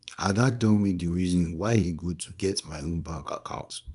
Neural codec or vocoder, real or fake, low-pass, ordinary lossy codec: codec, 24 kHz, 0.9 kbps, WavTokenizer, small release; fake; 10.8 kHz; none